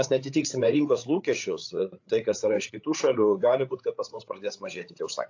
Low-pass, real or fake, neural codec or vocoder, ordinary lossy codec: 7.2 kHz; fake; codec, 16 kHz, 8 kbps, FreqCodec, larger model; AAC, 48 kbps